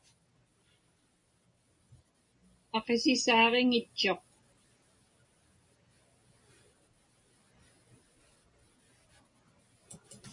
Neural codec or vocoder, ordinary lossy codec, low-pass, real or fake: none; MP3, 64 kbps; 10.8 kHz; real